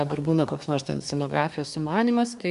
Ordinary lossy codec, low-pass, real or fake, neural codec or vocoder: MP3, 96 kbps; 10.8 kHz; fake; codec, 24 kHz, 1 kbps, SNAC